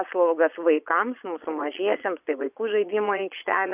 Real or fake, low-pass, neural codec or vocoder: fake; 3.6 kHz; vocoder, 22.05 kHz, 80 mel bands, Vocos